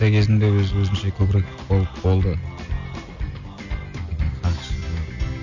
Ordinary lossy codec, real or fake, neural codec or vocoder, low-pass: none; real; none; 7.2 kHz